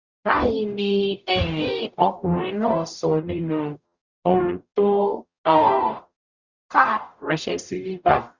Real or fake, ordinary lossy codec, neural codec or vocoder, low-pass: fake; Opus, 64 kbps; codec, 44.1 kHz, 0.9 kbps, DAC; 7.2 kHz